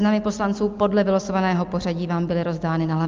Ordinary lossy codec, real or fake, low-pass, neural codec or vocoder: Opus, 24 kbps; real; 7.2 kHz; none